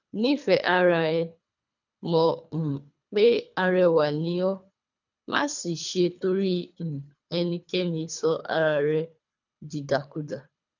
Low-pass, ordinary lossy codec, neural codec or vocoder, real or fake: 7.2 kHz; none; codec, 24 kHz, 3 kbps, HILCodec; fake